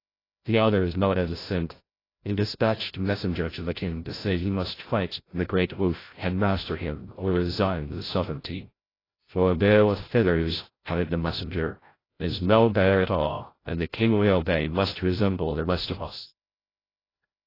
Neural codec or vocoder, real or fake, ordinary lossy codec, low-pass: codec, 16 kHz, 0.5 kbps, FreqCodec, larger model; fake; AAC, 24 kbps; 5.4 kHz